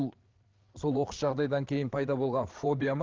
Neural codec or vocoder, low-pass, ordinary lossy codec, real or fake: codec, 16 kHz, 8 kbps, FreqCodec, larger model; 7.2 kHz; Opus, 16 kbps; fake